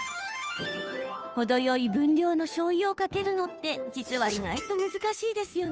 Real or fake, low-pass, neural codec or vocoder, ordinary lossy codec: fake; none; codec, 16 kHz, 2 kbps, FunCodec, trained on Chinese and English, 25 frames a second; none